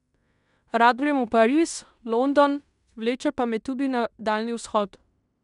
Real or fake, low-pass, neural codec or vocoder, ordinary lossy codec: fake; 10.8 kHz; codec, 16 kHz in and 24 kHz out, 0.9 kbps, LongCat-Audio-Codec, fine tuned four codebook decoder; none